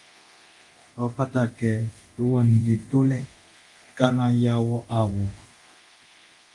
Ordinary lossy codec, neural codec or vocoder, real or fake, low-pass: Opus, 24 kbps; codec, 24 kHz, 0.9 kbps, DualCodec; fake; 10.8 kHz